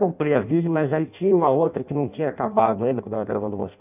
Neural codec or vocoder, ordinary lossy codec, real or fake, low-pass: codec, 16 kHz in and 24 kHz out, 0.6 kbps, FireRedTTS-2 codec; none; fake; 3.6 kHz